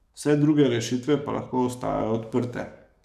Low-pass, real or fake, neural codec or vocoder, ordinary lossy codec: 14.4 kHz; fake; codec, 44.1 kHz, 7.8 kbps, DAC; none